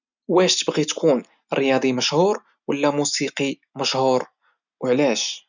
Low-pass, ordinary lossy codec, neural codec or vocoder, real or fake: 7.2 kHz; none; none; real